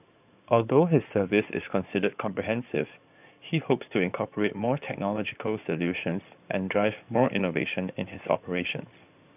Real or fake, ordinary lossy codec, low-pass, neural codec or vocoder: fake; none; 3.6 kHz; codec, 16 kHz in and 24 kHz out, 2.2 kbps, FireRedTTS-2 codec